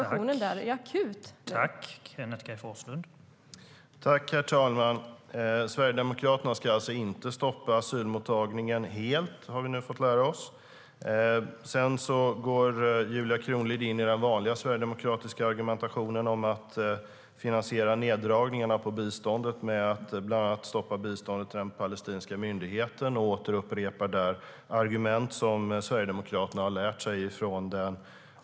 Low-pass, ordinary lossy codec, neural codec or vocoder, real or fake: none; none; none; real